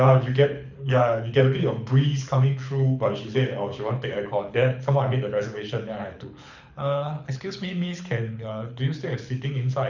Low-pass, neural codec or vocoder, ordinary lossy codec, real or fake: 7.2 kHz; codec, 24 kHz, 6 kbps, HILCodec; none; fake